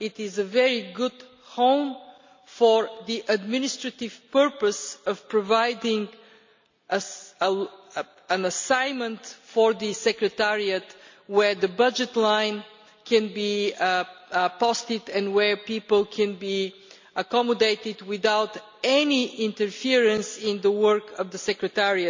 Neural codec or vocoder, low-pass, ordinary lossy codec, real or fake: none; 7.2 kHz; MP3, 64 kbps; real